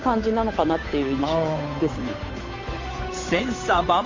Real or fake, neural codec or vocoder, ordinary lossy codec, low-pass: fake; codec, 16 kHz, 8 kbps, FunCodec, trained on Chinese and English, 25 frames a second; AAC, 32 kbps; 7.2 kHz